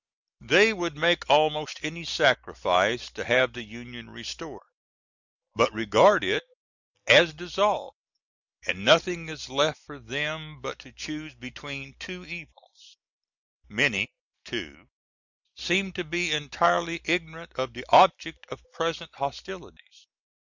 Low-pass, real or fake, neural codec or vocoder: 7.2 kHz; real; none